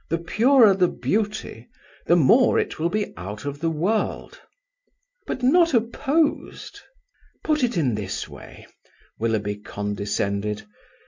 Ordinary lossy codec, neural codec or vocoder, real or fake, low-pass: MP3, 64 kbps; none; real; 7.2 kHz